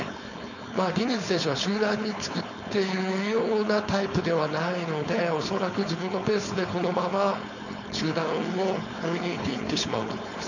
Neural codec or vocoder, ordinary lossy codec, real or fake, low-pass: codec, 16 kHz, 4.8 kbps, FACodec; none; fake; 7.2 kHz